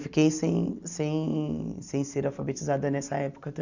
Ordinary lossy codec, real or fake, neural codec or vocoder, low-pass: none; real; none; 7.2 kHz